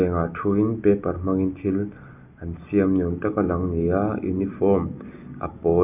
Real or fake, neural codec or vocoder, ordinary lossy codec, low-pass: real; none; none; 3.6 kHz